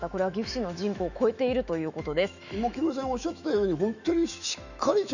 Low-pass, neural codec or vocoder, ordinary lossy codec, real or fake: 7.2 kHz; autoencoder, 48 kHz, 128 numbers a frame, DAC-VAE, trained on Japanese speech; none; fake